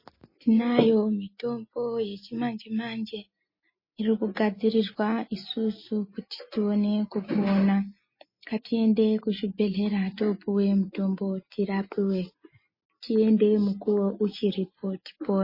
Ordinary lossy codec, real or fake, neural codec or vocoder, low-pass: MP3, 24 kbps; real; none; 5.4 kHz